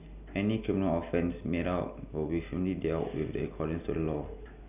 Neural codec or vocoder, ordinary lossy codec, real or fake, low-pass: none; none; real; 3.6 kHz